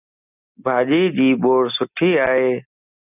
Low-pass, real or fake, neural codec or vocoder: 3.6 kHz; real; none